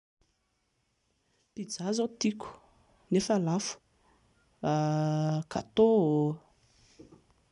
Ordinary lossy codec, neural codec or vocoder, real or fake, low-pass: none; none; real; 10.8 kHz